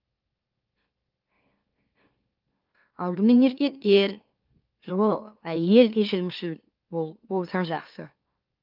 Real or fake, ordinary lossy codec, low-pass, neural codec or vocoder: fake; Opus, 32 kbps; 5.4 kHz; autoencoder, 44.1 kHz, a latent of 192 numbers a frame, MeloTTS